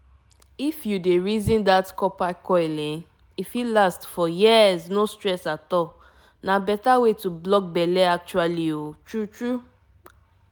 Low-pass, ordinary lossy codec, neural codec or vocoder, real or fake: none; none; none; real